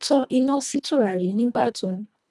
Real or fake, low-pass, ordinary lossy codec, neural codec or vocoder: fake; none; none; codec, 24 kHz, 1.5 kbps, HILCodec